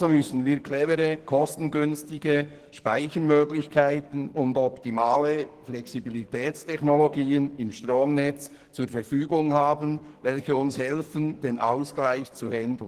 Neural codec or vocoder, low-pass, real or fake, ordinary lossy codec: codec, 44.1 kHz, 2.6 kbps, SNAC; 14.4 kHz; fake; Opus, 16 kbps